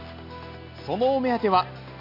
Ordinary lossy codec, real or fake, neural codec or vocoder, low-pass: none; real; none; 5.4 kHz